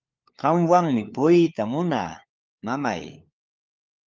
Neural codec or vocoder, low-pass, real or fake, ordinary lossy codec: codec, 16 kHz, 4 kbps, FunCodec, trained on LibriTTS, 50 frames a second; 7.2 kHz; fake; Opus, 24 kbps